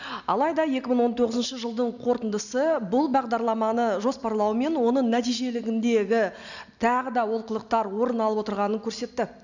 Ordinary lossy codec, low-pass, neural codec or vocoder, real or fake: none; 7.2 kHz; none; real